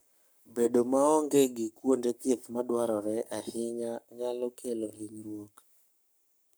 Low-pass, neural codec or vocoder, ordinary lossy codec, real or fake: none; codec, 44.1 kHz, 7.8 kbps, Pupu-Codec; none; fake